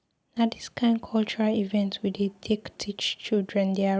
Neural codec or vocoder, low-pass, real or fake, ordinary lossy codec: none; none; real; none